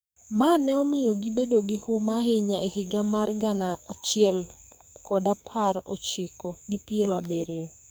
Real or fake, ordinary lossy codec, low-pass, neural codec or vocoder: fake; none; none; codec, 44.1 kHz, 2.6 kbps, SNAC